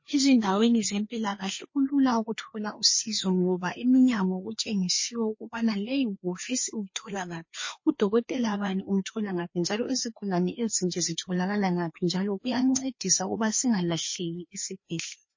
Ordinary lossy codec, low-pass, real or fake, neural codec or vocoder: MP3, 32 kbps; 7.2 kHz; fake; codec, 16 kHz, 2 kbps, FreqCodec, larger model